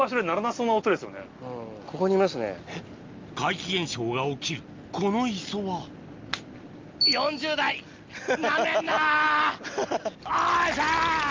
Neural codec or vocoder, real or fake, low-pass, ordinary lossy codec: none; real; 7.2 kHz; Opus, 32 kbps